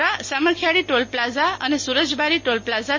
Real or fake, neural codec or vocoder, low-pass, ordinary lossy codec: real; none; 7.2 kHz; MP3, 32 kbps